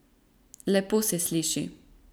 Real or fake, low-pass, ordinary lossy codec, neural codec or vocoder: real; none; none; none